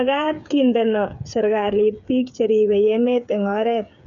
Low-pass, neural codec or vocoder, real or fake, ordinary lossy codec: 7.2 kHz; codec, 16 kHz, 8 kbps, FreqCodec, smaller model; fake; none